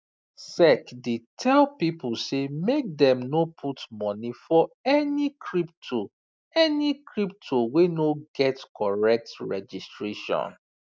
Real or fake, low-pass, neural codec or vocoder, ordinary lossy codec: real; none; none; none